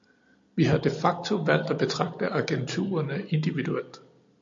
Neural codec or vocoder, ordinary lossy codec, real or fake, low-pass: none; MP3, 48 kbps; real; 7.2 kHz